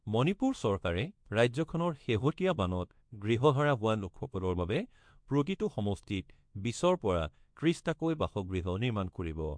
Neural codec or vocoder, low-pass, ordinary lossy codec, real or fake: codec, 24 kHz, 0.9 kbps, WavTokenizer, small release; 9.9 kHz; MP3, 64 kbps; fake